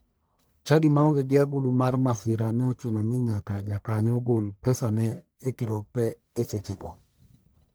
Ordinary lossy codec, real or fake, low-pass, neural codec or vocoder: none; fake; none; codec, 44.1 kHz, 1.7 kbps, Pupu-Codec